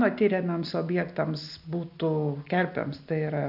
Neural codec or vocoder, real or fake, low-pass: none; real; 5.4 kHz